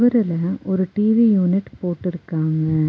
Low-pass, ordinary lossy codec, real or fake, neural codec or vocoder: none; none; real; none